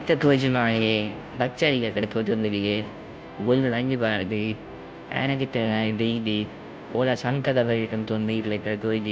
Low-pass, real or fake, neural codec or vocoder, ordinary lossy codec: none; fake; codec, 16 kHz, 0.5 kbps, FunCodec, trained on Chinese and English, 25 frames a second; none